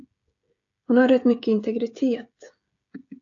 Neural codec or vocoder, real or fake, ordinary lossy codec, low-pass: codec, 16 kHz, 16 kbps, FreqCodec, smaller model; fake; AAC, 48 kbps; 7.2 kHz